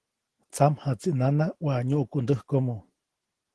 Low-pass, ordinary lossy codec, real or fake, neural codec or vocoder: 10.8 kHz; Opus, 16 kbps; real; none